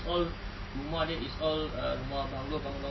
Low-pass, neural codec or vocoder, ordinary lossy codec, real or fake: 7.2 kHz; none; MP3, 24 kbps; real